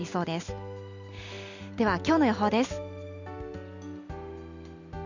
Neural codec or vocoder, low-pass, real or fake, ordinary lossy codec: none; 7.2 kHz; real; none